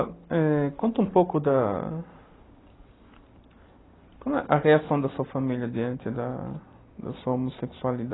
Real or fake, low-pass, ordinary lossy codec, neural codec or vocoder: real; 7.2 kHz; AAC, 16 kbps; none